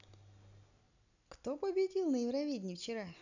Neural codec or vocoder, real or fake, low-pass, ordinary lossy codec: none; real; 7.2 kHz; none